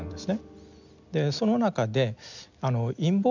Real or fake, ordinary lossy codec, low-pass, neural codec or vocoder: real; none; 7.2 kHz; none